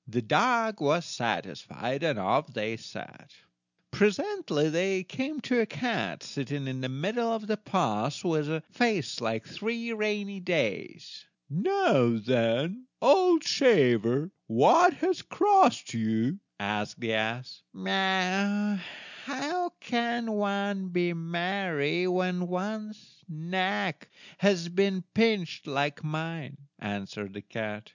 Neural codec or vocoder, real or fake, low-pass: none; real; 7.2 kHz